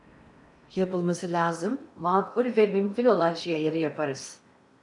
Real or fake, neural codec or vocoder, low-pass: fake; codec, 16 kHz in and 24 kHz out, 0.8 kbps, FocalCodec, streaming, 65536 codes; 10.8 kHz